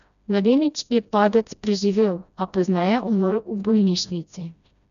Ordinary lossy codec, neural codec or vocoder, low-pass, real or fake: none; codec, 16 kHz, 1 kbps, FreqCodec, smaller model; 7.2 kHz; fake